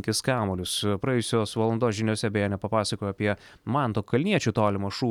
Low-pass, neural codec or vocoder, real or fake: 19.8 kHz; none; real